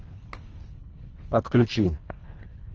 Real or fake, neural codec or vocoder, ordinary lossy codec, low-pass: fake; codec, 24 kHz, 1.5 kbps, HILCodec; Opus, 24 kbps; 7.2 kHz